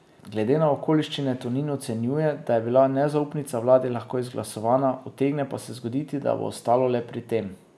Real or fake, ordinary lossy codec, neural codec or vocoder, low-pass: real; none; none; none